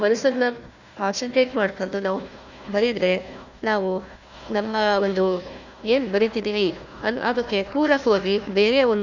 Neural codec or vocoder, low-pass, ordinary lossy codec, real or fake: codec, 16 kHz, 1 kbps, FunCodec, trained on Chinese and English, 50 frames a second; 7.2 kHz; none; fake